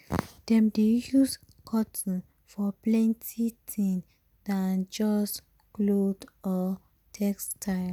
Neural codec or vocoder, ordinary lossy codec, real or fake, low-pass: none; none; real; 19.8 kHz